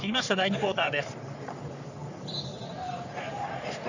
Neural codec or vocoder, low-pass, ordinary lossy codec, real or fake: codec, 44.1 kHz, 3.4 kbps, Pupu-Codec; 7.2 kHz; none; fake